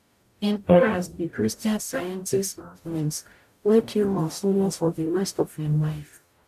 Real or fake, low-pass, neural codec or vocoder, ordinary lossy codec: fake; 14.4 kHz; codec, 44.1 kHz, 0.9 kbps, DAC; none